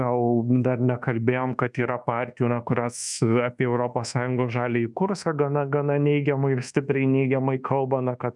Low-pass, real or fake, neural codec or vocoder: 10.8 kHz; fake; codec, 24 kHz, 1.2 kbps, DualCodec